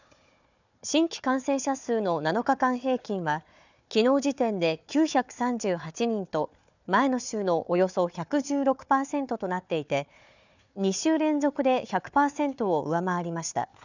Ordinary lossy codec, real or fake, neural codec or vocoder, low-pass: none; fake; codec, 16 kHz, 4 kbps, FunCodec, trained on Chinese and English, 50 frames a second; 7.2 kHz